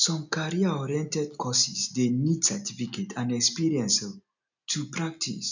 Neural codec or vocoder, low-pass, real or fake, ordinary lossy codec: none; 7.2 kHz; real; none